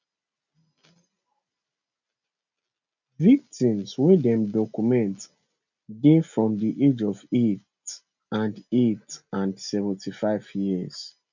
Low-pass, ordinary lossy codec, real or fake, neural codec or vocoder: 7.2 kHz; none; real; none